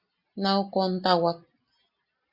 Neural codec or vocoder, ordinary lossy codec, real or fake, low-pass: none; Opus, 64 kbps; real; 5.4 kHz